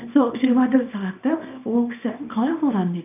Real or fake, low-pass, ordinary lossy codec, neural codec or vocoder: fake; 3.6 kHz; none; codec, 24 kHz, 0.9 kbps, WavTokenizer, small release